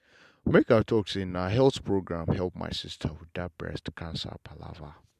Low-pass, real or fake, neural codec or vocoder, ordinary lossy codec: 14.4 kHz; real; none; MP3, 96 kbps